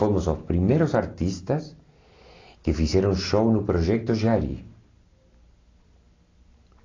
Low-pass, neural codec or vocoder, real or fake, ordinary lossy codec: 7.2 kHz; none; real; AAC, 32 kbps